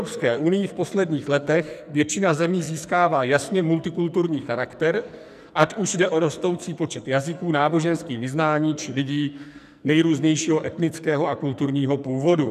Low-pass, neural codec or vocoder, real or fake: 14.4 kHz; codec, 44.1 kHz, 2.6 kbps, SNAC; fake